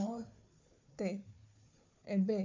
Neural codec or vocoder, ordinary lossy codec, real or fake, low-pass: codec, 16 kHz, 4 kbps, FunCodec, trained on Chinese and English, 50 frames a second; MP3, 64 kbps; fake; 7.2 kHz